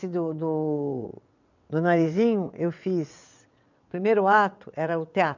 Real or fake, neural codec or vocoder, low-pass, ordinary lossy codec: fake; vocoder, 22.05 kHz, 80 mel bands, WaveNeXt; 7.2 kHz; none